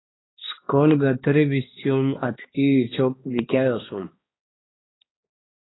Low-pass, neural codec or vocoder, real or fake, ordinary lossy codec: 7.2 kHz; codec, 16 kHz, 2 kbps, X-Codec, HuBERT features, trained on balanced general audio; fake; AAC, 16 kbps